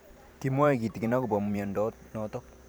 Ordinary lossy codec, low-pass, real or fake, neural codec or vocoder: none; none; fake; vocoder, 44.1 kHz, 128 mel bands every 512 samples, BigVGAN v2